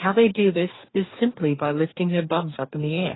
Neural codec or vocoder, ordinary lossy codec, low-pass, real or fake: codec, 44.1 kHz, 2.6 kbps, DAC; AAC, 16 kbps; 7.2 kHz; fake